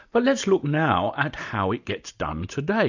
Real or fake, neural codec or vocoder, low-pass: real; none; 7.2 kHz